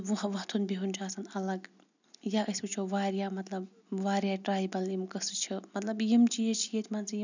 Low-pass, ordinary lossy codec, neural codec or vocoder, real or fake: 7.2 kHz; none; none; real